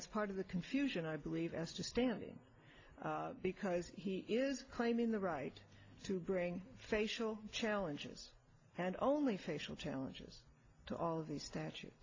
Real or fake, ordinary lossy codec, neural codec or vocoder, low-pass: real; AAC, 32 kbps; none; 7.2 kHz